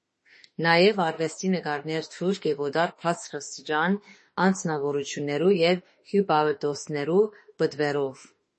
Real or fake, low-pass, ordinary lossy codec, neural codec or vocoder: fake; 10.8 kHz; MP3, 32 kbps; autoencoder, 48 kHz, 32 numbers a frame, DAC-VAE, trained on Japanese speech